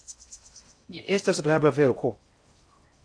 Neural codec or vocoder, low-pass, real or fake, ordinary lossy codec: codec, 16 kHz in and 24 kHz out, 0.6 kbps, FocalCodec, streaming, 4096 codes; 9.9 kHz; fake; AAC, 48 kbps